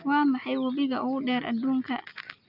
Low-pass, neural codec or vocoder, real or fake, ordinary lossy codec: 5.4 kHz; none; real; none